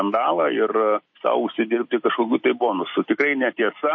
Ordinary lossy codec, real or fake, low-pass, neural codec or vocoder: MP3, 32 kbps; fake; 7.2 kHz; vocoder, 44.1 kHz, 128 mel bands every 256 samples, BigVGAN v2